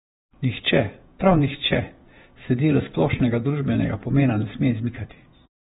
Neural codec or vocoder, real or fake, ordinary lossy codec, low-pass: vocoder, 48 kHz, 128 mel bands, Vocos; fake; AAC, 16 kbps; 19.8 kHz